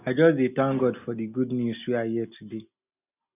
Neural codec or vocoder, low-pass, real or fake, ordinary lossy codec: none; 3.6 kHz; real; none